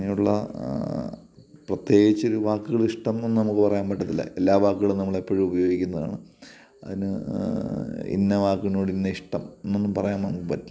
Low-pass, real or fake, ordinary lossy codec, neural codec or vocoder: none; real; none; none